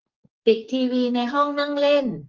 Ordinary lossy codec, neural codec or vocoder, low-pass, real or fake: Opus, 24 kbps; codec, 44.1 kHz, 2.6 kbps, SNAC; 7.2 kHz; fake